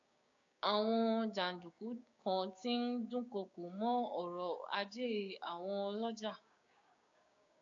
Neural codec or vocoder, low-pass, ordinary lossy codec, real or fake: codec, 16 kHz, 6 kbps, DAC; 7.2 kHz; AAC, 48 kbps; fake